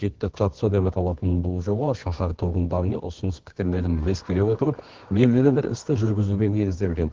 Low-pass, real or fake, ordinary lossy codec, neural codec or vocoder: 7.2 kHz; fake; Opus, 16 kbps; codec, 24 kHz, 0.9 kbps, WavTokenizer, medium music audio release